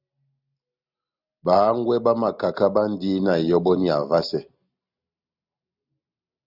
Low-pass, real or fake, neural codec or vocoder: 5.4 kHz; real; none